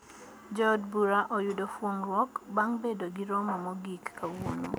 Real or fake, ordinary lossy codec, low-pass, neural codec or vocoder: real; none; none; none